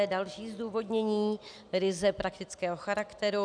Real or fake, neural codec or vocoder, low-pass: real; none; 9.9 kHz